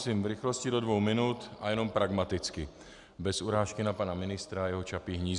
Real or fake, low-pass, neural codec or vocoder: real; 10.8 kHz; none